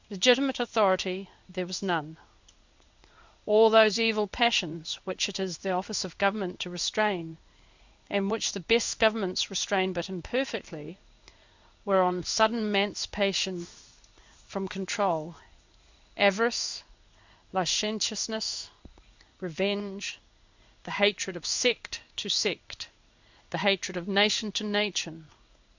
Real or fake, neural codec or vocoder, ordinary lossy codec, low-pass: fake; codec, 16 kHz in and 24 kHz out, 1 kbps, XY-Tokenizer; Opus, 64 kbps; 7.2 kHz